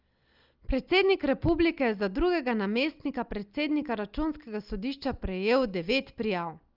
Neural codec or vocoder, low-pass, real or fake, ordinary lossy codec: none; 5.4 kHz; real; Opus, 32 kbps